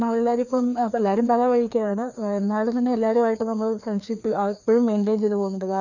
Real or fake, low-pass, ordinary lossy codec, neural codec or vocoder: fake; 7.2 kHz; none; codec, 16 kHz, 2 kbps, FreqCodec, larger model